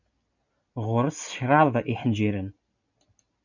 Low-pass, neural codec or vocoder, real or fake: 7.2 kHz; none; real